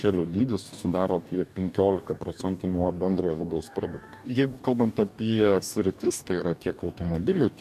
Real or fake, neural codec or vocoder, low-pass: fake; codec, 44.1 kHz, 2.6 kbps, DAC; 14.4 kHz